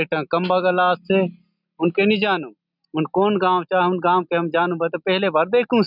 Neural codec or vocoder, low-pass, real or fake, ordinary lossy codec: none; 5.4 kHz; real; none